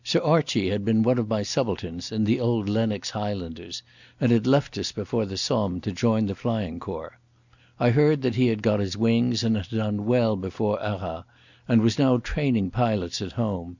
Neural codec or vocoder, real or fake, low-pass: none; real; 7.2 kHz